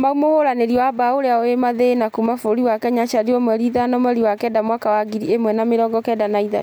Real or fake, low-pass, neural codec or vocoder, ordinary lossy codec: real; none; none; none